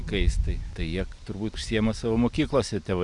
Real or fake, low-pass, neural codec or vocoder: real; 10.8 kHz; none